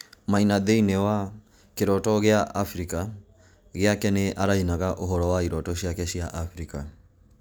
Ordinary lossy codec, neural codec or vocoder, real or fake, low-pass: none; none; real; none